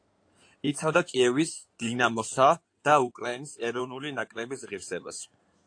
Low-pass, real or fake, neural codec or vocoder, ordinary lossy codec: 9.9 kHz; fake; codec, 16 kHz in and 24 kHz out, 2.2 kbps, FireRedTTS-2 codec; AAC, 48 kbps